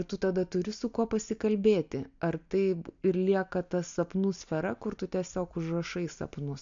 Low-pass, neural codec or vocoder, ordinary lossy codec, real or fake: 7.2 kHz; none; AAC, 64 kbps; real